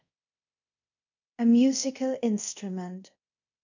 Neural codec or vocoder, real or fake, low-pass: codec, 24 kHz, 0.5 kbps, DualCodec; fake; 7.2 kHz